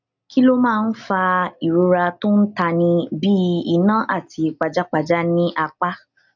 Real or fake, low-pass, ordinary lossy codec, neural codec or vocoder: real; 7.2 kHz; none; none